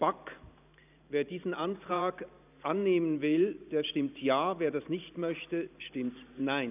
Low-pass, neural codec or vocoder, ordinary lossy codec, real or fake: 3.6 kHz; vocoder, 44.1 kHz, 128 mel bands every 512 samples, BigVGAN v2; none; fake